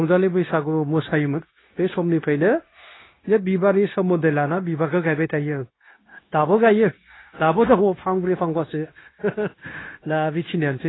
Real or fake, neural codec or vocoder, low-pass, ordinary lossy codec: fake; codec, 16 kHz, 0.9 kbps, LongCat-Audio-Codec; 7.2 kHz; AAC, 16 kbps